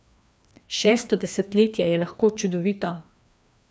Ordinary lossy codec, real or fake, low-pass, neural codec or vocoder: none; fake; none; codec, 16 kHz, 2 kbps, FreqCodec, larger model